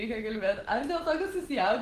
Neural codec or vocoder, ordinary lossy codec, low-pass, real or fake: none; Opus, 16 kbps; 14.4 kHz; real